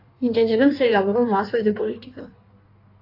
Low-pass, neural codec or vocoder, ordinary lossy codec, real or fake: 5.4 kHz; codec, 16 kHz in and 24 kHz out, 1.1 kbps, FireRedTTS-2 codec; AAC, 32 kbps; fake